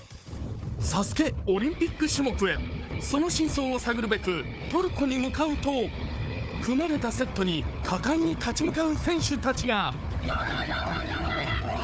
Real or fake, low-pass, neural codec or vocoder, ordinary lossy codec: fake; none; codec, 16 kHz, 4 kbps, FunCodec, trained on Chinese and English, 50 frames a second; none